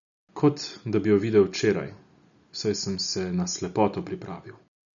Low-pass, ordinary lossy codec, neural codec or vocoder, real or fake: 7.2 kHz; none; none; real